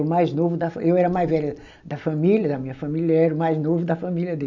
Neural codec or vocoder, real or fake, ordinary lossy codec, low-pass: none; real; none; 7.2 kHz